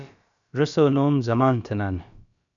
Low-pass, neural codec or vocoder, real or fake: 7.2 kHz; codec, 16 kHz, about 1 kbps, DyCAST, with the encoder's durations; fake